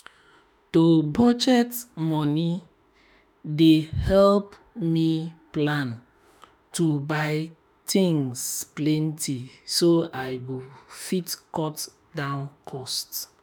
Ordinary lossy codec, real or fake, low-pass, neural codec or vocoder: none; fake; none; autoencoder, 48 kHz, 32 numbers a frame, DAC-VAE, trained on Japanese speech